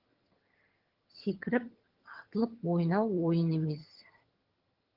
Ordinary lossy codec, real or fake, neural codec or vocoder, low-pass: Opus, 16 kbps; fake; vocoder, 22.05 kHz, 80 mel bands, HiFi-GAN; 5.4 kHz